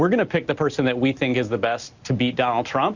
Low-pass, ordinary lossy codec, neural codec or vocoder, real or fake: 7.2 kHz; Opus, 64 kbps; none; real